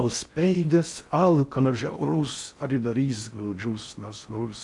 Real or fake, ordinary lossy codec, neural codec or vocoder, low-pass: fake; AAC, 64 kbps; codec, 16 kHz in and 24 kHz out, 0.6 kbps, FocalCodec, streaming, 4096 codes; 10.8 kHz